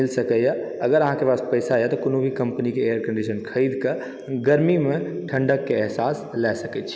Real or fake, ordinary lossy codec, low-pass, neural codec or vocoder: real; none; none; none